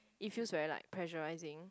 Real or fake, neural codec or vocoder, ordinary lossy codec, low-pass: real; none; none; none